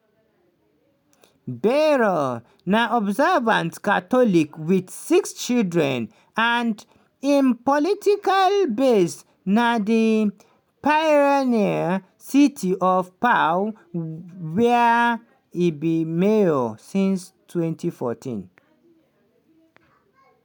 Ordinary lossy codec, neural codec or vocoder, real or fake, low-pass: none; none; real; 19.8 kHz